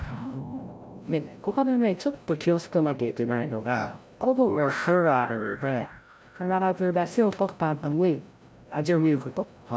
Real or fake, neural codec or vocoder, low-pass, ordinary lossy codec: fake; codec, 16 kHz, 0.5 kbps, FreqCodec, larger model; none; none